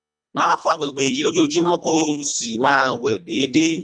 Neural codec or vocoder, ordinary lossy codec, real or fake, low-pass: codec, 24 kHz, 1.5 kbps, HILCodec; none; fake; 9.9 kHz